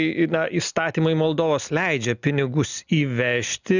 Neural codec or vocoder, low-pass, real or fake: none; 7.2 kHz; real